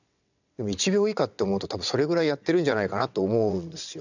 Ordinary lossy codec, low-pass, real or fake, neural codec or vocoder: none; 7.2 kHz; fake; vocoder, 22.05 kHz, 80 mel bands, WaveNeXt